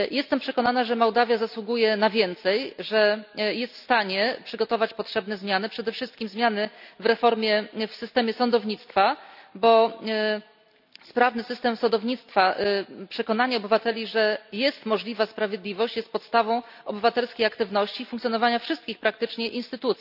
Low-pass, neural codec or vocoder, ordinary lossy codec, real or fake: 5.4 kHz; none; none; real